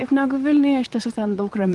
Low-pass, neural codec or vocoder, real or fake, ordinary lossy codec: 10.8 kHz; vocoder, 44.1 kHz, 128 mel bands, Pupu-Vocoder; fake; Opus, 24 kbps